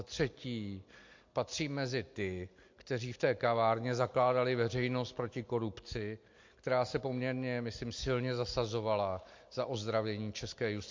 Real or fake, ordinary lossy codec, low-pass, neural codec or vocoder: real; MP3, 48 kbps; 7.2 kHz; none